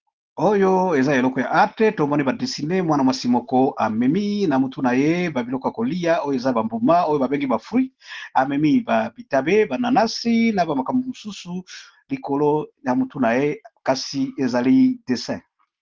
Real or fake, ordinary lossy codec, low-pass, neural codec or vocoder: real; Opus, 16 kbps; 7.2 kHz; none